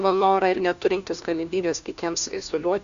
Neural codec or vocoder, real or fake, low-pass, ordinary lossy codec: codec, 16 kHz, 1 kbps, FunCodec, trained on LibriTTS, 50 frames a second; fake; 7.2 kHz; Opus, 64 kbps